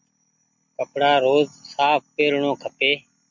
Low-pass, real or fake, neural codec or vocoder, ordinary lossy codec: 7.2 kHz; real; none; MP3, 64 kbps